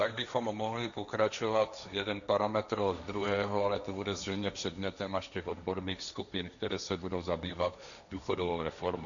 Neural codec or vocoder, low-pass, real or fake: codec, 16 kHz, 1.1 kbps, Voila-Tokenizer; 7.2 kHz; fake